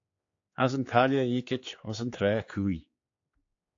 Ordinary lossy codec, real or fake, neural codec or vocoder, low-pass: AAC, 48 kbps; fake; codec, 16 kHz, 4 kbps, X-Codec, HuBERT features, trained on general audio; 7.2 kHz